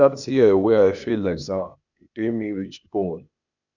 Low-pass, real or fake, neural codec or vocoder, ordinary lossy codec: 7.2 kHz; fake; codec, 16 kHz, 0.8 kbps, ZipCodec; none